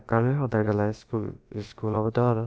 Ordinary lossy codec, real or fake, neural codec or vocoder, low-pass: none; fake; codec, 16 kHz, about 1 kbps, DyCAST, with the encoder's durations; none